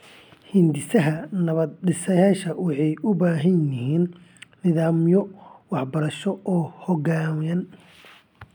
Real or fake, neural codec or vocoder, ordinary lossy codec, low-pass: real; none; none; 19.8 kHz